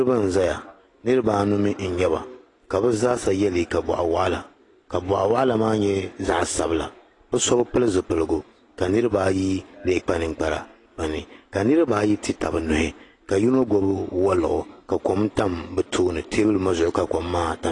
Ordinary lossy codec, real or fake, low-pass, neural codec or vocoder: AAC, 32 kbps; real; 10.8 kHz; none